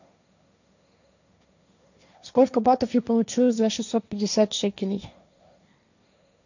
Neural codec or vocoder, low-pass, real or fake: codec, 16 kHz, 1.1 kbps, Voila-Tokenizer; 7.2 kHz; fake